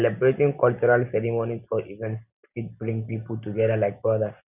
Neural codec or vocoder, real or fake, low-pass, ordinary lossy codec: none; real; 3.6 kHz; none